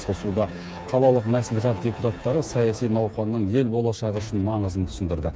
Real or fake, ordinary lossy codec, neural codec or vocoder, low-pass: fake; none; codec, 16 kHz, 4 kbps, FreqCodec, smaller model; none